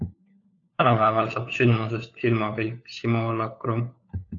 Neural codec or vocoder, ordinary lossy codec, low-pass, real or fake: codec, 16 kHz, 4 kbps, FunCodec, trained on LibriTTS, 50 frames a second; AAC, 48 kbps; 7.2 kHz; fake